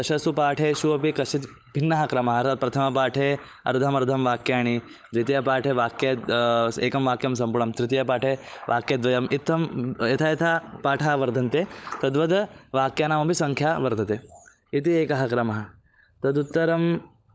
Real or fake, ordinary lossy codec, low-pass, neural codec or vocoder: fake; none; none; codec, 16 kHz, 16 kbps, FunCodec, trained on LibriTTS, 50 frames a second